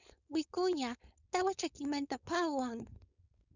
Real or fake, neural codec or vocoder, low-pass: fake; codec, 16 kHz, 4.8 kbps, FACodec; 7.2 kHz